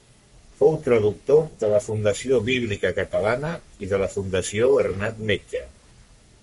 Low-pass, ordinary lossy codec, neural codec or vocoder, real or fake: 14.4 kHz; MP3, 48 kbps; codec, 44.1 kHz, 3.4 kbps, Pupu-Codec; fake